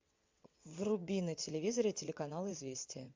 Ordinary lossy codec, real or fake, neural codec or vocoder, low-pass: MP3, 64 kbps; fake; vocoder, 22.05 kHz, 80 mel bands, WaveNeXt; 7.2 kHz